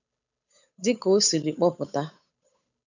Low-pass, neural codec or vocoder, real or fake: 7.2 kHz; codec, 16 kHz, 8 kbps, FunCodec, trained on Chinese and English, 25 frames a second; fake